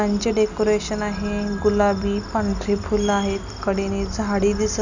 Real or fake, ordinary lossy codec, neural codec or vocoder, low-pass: real; none; none; 7.2 kHz